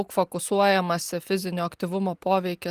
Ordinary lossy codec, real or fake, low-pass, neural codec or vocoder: Opus, 24 kbps; real; 14.4 kHz; none